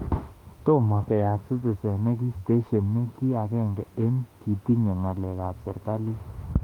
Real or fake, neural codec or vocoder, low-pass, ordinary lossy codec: fake; autoencoder, 48 kHz, 32 numbers a frame, DAC-VAE, trained on Japanese speech; 19.8 kHz; Opus, 32 kbps